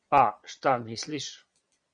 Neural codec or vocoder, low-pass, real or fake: vocoder, 22.05 kHz, 80 mel bands, Vocos; 9.9 kHz; fake